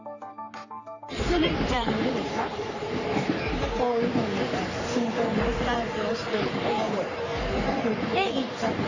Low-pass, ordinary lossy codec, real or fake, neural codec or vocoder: 7.2 kHz; AAC, 48 kbps; fake; codec, 44.1 kHz, 3.4 kbps, Pupu-Codec